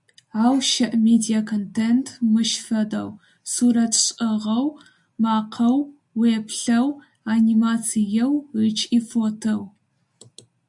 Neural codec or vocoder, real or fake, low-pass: none; real; 10.8 kHz